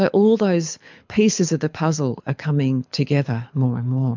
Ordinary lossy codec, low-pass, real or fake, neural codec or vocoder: MP3, 64 kbps; 7.2 kHz; fake; codec, 24 kHz, 6 kbps, HILCodec